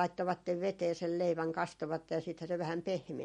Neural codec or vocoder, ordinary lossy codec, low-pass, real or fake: none; MP3, 48 kbps; 19.8 kHz; real